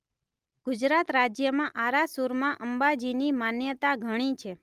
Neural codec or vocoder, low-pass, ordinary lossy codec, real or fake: none; 14.4 kHz; Opus, 32 kbps; real